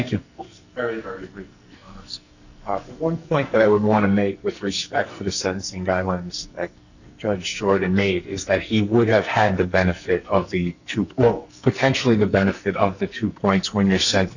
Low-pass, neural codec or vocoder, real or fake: 7.2 kHz; codec, 44.1 kHz, 2.6 kbps, SNAC; fake